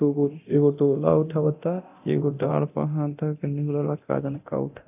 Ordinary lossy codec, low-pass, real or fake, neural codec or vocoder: AAC, 32 kbps; 3.6 kHz; fake; codec, 24 kHz, 0.9 kbps, DualCodec